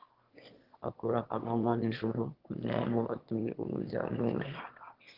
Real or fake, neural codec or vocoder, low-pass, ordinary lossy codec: fake; autoencoder, 22.05 kHz, a latent of 192 numbers a frame, VITS, trained on one speaker; 5.4 kHz; Opus, 16 kbps